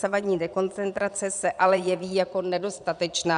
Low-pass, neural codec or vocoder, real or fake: 9.9 kHz; vocoder, 22.05 kHz, 80 mel bands, Vocos; fake